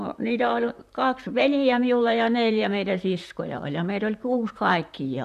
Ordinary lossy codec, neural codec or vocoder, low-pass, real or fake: none; vocoder, 44.1 kHz, 128 mel bands, Pupu-Vocoder; 14.4 kHz; fake